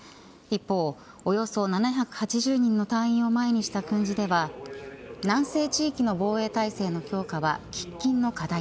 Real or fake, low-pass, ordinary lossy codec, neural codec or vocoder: real; none; none; none